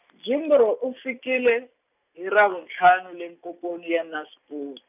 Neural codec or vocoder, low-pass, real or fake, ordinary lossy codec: none; 3.6 kHz; real; none